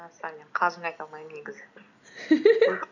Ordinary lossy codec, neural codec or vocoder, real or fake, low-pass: none; none; real; 7.2 kHz